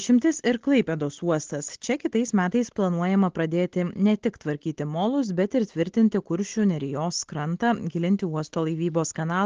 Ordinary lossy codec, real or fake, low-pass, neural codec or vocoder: Opus, 16 kbps; real; 7.2 kHz; none